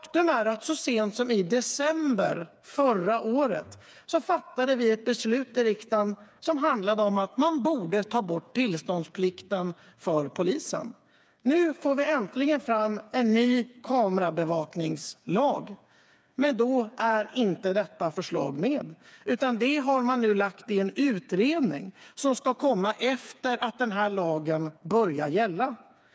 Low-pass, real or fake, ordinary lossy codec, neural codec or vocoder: none; fake; none; codec, 16 kHz, 4 kbps, FreqCodec, smaller model